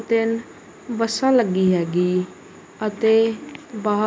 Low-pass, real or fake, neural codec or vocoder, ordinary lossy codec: none; real; none; none